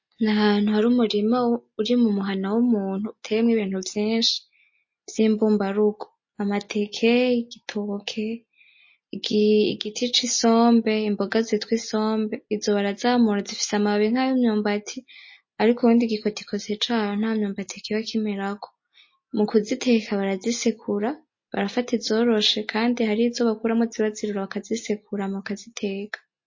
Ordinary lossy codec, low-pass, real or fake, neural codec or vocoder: MP3, 32 kbps; 7.2 kHz; real; none